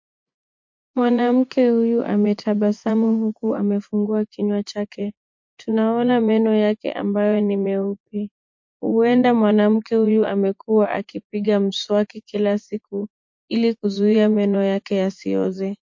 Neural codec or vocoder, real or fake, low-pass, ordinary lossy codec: vocoder, 24 kHz, 100 mel bands, Vocos; fake; 7.2 kHz; MP3, 48 kbps